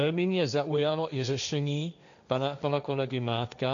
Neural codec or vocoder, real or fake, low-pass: codec, 16 kHz, 1.1 kbps, Voila-Tokenizer; fake; 7.2 kHz